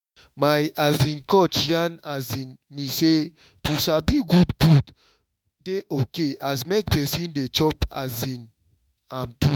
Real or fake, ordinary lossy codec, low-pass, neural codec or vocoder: fake; MP3, 96 kbps; 19.8 kHz; autoencoder, 48 kHz, 32 numbers a frame, DAC-VAE, trained on Japanese speech